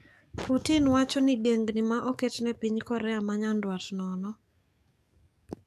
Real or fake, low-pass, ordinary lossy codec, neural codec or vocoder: fake; 14.4 kHz; MP3, 96 kbps; codec, 44.1 kHz, 7.8 kbps, DAC